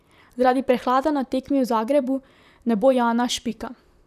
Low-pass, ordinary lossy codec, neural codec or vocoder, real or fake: 14.4 kHz; none; vocoder, 44.1 kHz, 128 mel bands, Pupu-Vocoder; fake